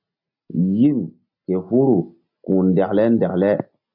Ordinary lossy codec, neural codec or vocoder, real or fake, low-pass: MP3, 48 kbps; none; real; 5.4 kHz